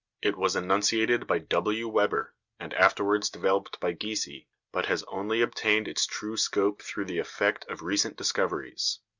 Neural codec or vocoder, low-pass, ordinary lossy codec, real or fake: none; 7.2 kHz; Opus, 64 kbps; real